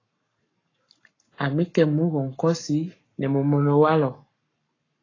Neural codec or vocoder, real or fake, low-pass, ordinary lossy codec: codec, 44.1 kHz, 7.8 kbps, Pupu-Codec; fake; 7.2 kHz; AAC, 32 kbps